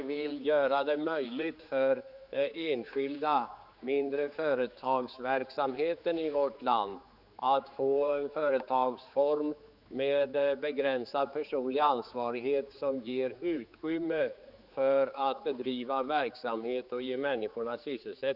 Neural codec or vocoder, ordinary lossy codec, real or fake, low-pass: codec, 16 kHz, 4 kbps, X-Codec, HuBERT features, trained on general audio; none; fake; 5.4 kHz